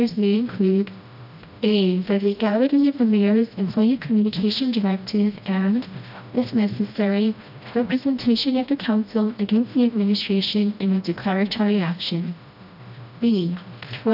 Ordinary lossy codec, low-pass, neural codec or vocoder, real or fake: AAC, 48 kbps; 5.4 kHz; codec, 16 kHz, 1 kbps, FreqCodec, smaller model; fake